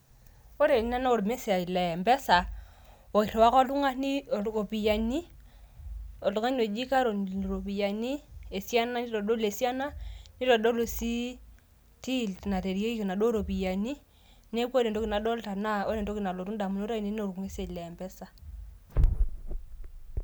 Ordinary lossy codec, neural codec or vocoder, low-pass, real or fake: none; none; none; real